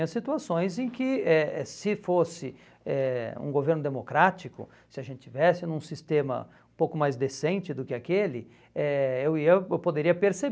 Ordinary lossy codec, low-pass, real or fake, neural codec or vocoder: none; none; real; none